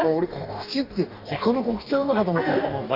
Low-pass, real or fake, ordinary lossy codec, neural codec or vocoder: 5.4 kHz; fake; Opus, 64 kbps; codec, 44.1 kHz, 2.6 kbps, DAC